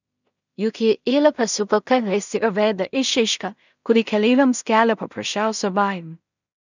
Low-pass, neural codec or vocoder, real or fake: 7.2 kHz; codec, 16 kHz in and 24 kHz out, 0.4 kbps, LongCat-Audio-Codec, two codebook decoder; fake